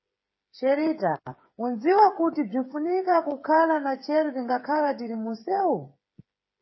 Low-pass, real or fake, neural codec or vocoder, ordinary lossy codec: 7.2 kHz; fake; codec, 16 kHz, 8 kbps, FreqCodec, smaller model; MP3, 24 kbps